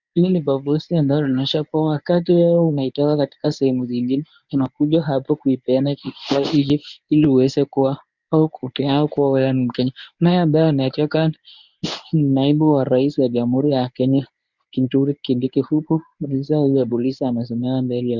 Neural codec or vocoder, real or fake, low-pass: codec, 24 kHz, 0.9 kbps, WavTokenizer, medium speech release version 1; fake; 7.2 kHz